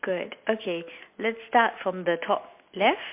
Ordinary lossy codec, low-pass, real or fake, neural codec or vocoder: MP3, 32 kbps; 3.6 kHz; real; none